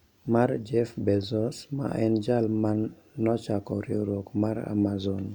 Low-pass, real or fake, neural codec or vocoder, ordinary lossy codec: 19.8 kHz; real; none; none